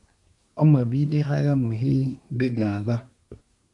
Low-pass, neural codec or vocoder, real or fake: 10.8 kHz; codec, 24 kHz, 1 kbps, SNAC; fake